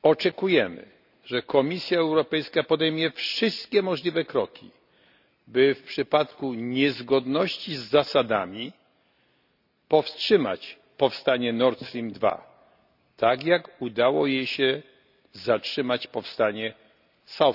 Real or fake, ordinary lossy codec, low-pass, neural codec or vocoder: real; none; 5.4 kHz; none